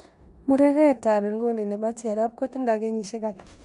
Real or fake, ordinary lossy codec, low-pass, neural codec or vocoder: fake; none; 10.8 kHz; codec, 16 kHz in and 24 kHz out, 0.9 kbps, LongCat-Audio-Codec, four codebook decoder